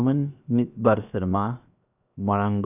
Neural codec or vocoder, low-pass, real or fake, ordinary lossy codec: codec, 16 kHz, 0.7 kbps, FocalCodec; 3.6 kHz; fake; none